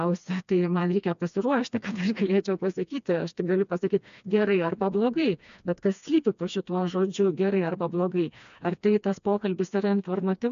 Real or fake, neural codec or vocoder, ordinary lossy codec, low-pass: fake; codec, 16 kHz, 2 kbps, FreqCodec, smaller model; AAC, 96 kbps; 7.2 kHz